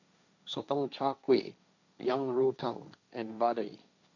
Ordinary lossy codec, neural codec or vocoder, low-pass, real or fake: none; codec, 16 kHz, 1.1 kbps, Voila-Tokenizer; 7.2 kHz; fake